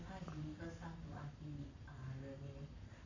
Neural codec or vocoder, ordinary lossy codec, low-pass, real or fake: codec, 44.1 kHz, 7.8 kbps, Pupu-Codec; AAC, 48 kbps; 7.2 kHz; fake